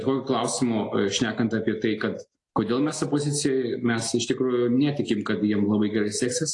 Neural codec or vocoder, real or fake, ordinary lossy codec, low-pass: none; real; AAC, 48 kbps; 10.8 kHz